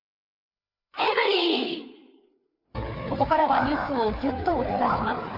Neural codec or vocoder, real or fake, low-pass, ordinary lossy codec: codec, 16 kHz, 4 kbps, FreqCodec, larger model; fake; 5.4 kHz; none